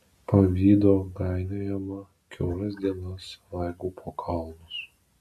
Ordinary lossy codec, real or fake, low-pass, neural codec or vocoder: MP3, 96 kbps; real; 14.4 kHz; none